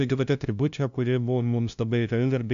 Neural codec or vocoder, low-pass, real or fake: codec, 16 kHz, 0.5 kbps, FunCodec, trained on LibriTTS, 25 frames a second; 7.2 kHz; fake